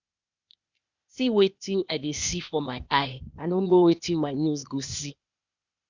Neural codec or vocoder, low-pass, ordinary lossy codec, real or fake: codec, 16 kHz, 0.8 kbps, ZipCodec; 7.2 kHz; Opus, 64 kbps; fake